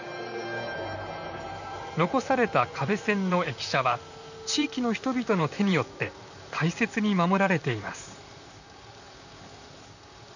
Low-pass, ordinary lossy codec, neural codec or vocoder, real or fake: 7.2 kHz; none; vocoder, 44.1 kHz, 128 mel bands, Pupu-Vocoder; fake